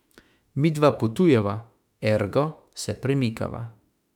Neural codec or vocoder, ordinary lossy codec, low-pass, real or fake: autoencoder, 48 kHz, 32 numbers a frame, DAC-VAE, trained on Japanese speech; none; 19.8 kHz; fake